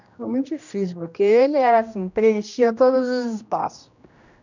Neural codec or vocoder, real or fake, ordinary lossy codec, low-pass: codec, 16 kHz, 1 kbps, X-Codec, HuBERT features, trained on general audio; fake; none; 7.2 kHz